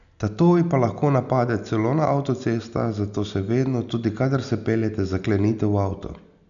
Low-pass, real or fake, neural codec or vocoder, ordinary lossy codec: 7.2 kHz; real; none; none